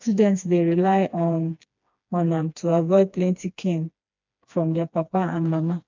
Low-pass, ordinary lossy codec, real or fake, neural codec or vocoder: 7.2 kHz; MP3, 64 kbps; fake; codec, 16 kHz, 2 kbps, FreqCodec, smaller model